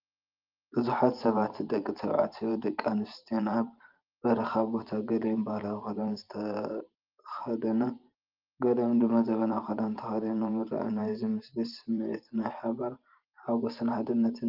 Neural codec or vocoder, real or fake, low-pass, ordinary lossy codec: vocoder, 44.1 kHz, 128 mel bands every 512 samples, BigVGAN v2; fake; 5.4 kHz; Opus, 24 kbps